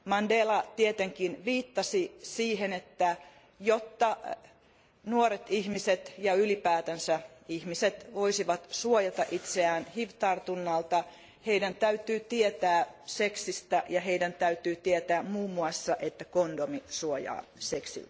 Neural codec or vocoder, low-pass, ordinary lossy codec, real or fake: none; none; none; real